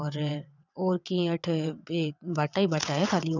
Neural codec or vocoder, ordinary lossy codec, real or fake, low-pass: vocoder, 22.05 kHz, 80 mel bands, WaveNeXt; none; fake; 7.2 kHz